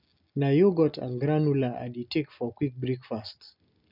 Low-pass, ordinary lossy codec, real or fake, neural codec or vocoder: 5.4 kHz; none; real; none